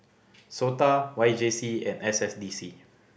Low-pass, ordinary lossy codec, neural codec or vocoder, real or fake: none; none; none; real